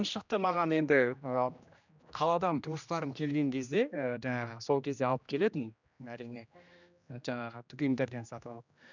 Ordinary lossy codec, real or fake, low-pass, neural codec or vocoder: none; fake; 7.2 kHz; codec, 16 kHz, 1 kbps, X-Codec, HuBERT features, trained on general audio